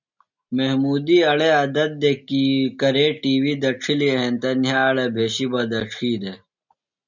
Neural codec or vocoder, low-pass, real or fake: none; 7.2 kHz; real